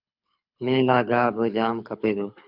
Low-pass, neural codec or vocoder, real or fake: 5.4 kHz; codec, 24 kHz, 6 kbps, HILCodec; fake